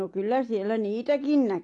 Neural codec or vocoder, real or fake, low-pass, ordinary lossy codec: none; real; 10.8 kHz; AAC, 48 kbps